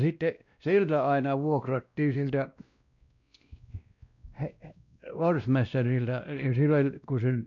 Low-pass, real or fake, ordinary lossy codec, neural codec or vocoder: 7.2 kHz; fake; none; codec, 16 kHz, 1 kbps, X-Codec, WavLM features, trained on Multilingual LibriSpeech